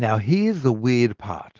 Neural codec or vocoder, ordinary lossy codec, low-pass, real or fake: none; Opus, 16 kbps; 7.2 kHz; real